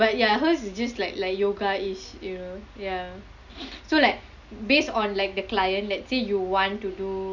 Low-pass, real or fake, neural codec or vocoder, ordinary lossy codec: 7.2 kHz; real; none; none